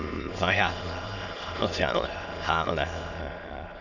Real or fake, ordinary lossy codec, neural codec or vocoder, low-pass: fake; none; autoencoder, 22.05 kHz, a latent of 192 numbers a frame, VITS, trained on many speakers; 7.2 kHz